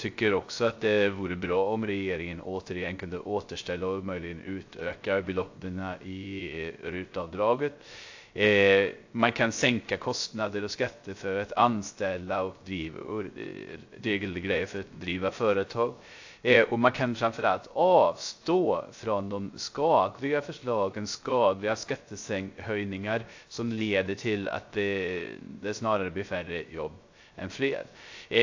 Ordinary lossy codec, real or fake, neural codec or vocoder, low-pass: AAC, 48 kbps; fake; codec, 16 kHz, 0.3 kbps, FocalCodec; 7.2 kHz